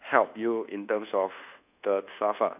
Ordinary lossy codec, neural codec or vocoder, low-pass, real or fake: none; codec, 24 kHz, 1.2 kbps, DualCodec; 3.6 kHz; fake